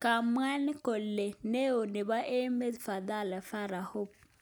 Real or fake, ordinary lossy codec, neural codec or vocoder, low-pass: real; none; none; none